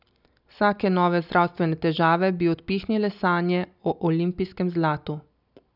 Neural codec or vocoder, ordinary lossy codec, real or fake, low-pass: none; none; real; 5.4 kHz